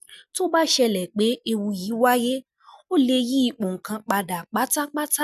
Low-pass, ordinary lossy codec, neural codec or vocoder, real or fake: 14.4 kHz; none; none; real